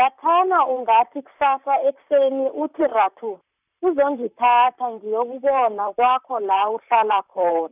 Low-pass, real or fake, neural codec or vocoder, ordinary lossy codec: 3.6 kHz; fake; vocoder, 44.1 kHz, 128 mel bands, Pupu-Vocoder; none